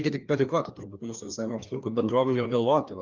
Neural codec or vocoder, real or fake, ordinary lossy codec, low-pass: codec, 16 kHz, 2 kbps, FreqCodec, larger model; fake; Opus, 32 kbps; 7.2 kHz